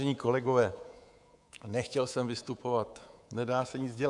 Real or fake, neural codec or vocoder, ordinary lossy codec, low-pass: fake; codec, 24 kHz, 3.1 kbps, DualCodec; AAC, 64 kbps; 10.8 kHz